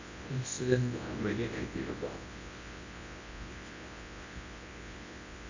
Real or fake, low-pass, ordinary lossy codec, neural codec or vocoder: fake; 7.2 kHz; AAC, 48 kbps; codec, 24 kHz, 0.9 kbps, WavTokenizer, large speech release